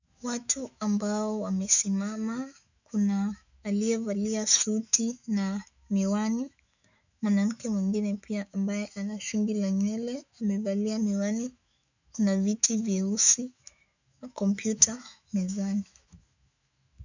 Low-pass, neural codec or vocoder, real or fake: 7.2 kHz; autoencoder, 48 kHz, 128 numbers a frame, DAC-VAE, trained on Japanese speech; fake